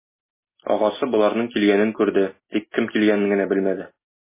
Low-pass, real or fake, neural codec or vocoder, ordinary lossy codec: 3.6 kHz; real; none; MP3, 16 kbps